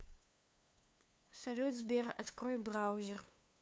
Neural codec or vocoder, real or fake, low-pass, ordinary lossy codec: codec, 16 kHz, 2 kbps, FunCodec, trained on LibriTTS, 25 frames a second; fake; none; none